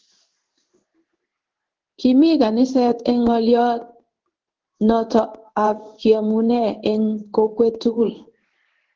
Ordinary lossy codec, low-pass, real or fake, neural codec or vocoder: Opus, 16 kbps; 7.2 kHz; fake; codec, 16 kHz in and 24 kHz out, 1 kbps, XY-Tokenizer